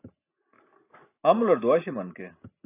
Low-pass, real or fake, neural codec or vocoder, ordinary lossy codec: 3.6 kHz; real; none; AAC, 24 kbps